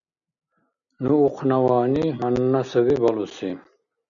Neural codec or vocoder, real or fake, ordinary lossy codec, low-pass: none; real; MP3, 96 kbps; 7.2 kHz